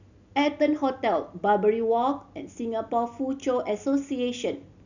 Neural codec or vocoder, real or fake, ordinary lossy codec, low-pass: none; real; none; 7.2 kHz